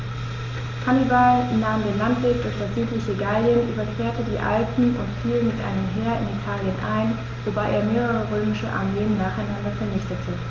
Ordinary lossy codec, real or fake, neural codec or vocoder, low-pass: Opus, 32 kbps; real; none; 7.2 kHz